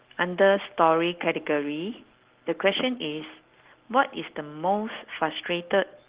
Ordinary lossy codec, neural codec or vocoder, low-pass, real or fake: Opus, 16 kbps; none; 3.6 kHz; real